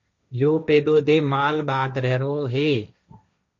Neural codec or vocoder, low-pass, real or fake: codec, 16 kHz, 1.1 kbps, Voila-Tokenizer; 7.2 kHz; fake